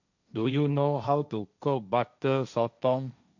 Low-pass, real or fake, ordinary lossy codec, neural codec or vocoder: 7.2 kHz; fake; none; codec, 16 kHz, 1.1 kbps, Voila-Tokenizer